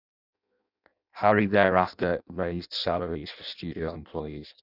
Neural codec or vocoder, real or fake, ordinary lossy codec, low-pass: codec, 16 kHz in and 24 kHz out, 0.6 kbps, FireRedTTS-2 codec; fake; none; 5.4 kHz